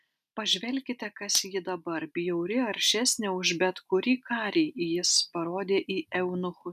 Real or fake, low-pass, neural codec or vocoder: real; 14.4 kHz; none